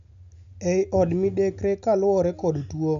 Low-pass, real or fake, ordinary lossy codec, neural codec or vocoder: 7.2 kHz; real; none; none